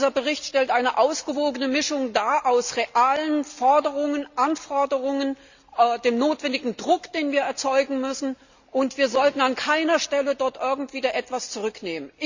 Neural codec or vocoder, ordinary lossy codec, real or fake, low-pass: none; Opus, 64 kbps; real; 7.2 kHz